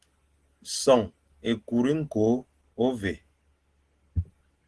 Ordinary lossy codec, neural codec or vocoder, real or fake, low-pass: Opus, 16 kbps; none; real; 10.8 kHz